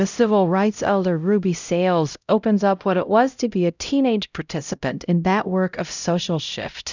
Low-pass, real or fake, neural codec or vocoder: 7.2 kHz; fake; codec, 16 kHz, 0.5 kbps, X-Codec, HuBERT features, trained on LibriSpeech